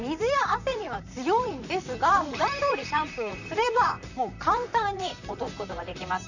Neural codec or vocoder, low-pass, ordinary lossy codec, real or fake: vocoder, 44.1 kHz, 128 mel bands, Pupu-Vocoder; 7.2 kHz; none; fake